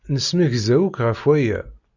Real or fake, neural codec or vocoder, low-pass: real; none; 7.2 kHz